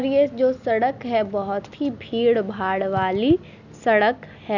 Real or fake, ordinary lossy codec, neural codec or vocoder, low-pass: real; none; none; 7.2 kHz